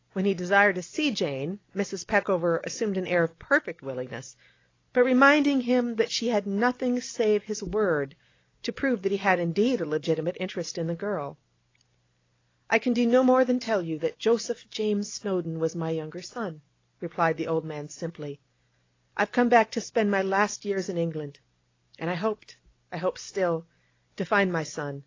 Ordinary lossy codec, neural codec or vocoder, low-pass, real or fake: AAC, 32 kbps; none; 7.2 kHz; real